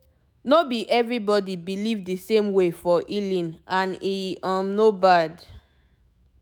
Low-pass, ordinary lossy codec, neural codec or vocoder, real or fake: none; none; autoencoder, 48 kHz, 128 numbers a frame, DAC-VAE, trained on Japanese speech; fake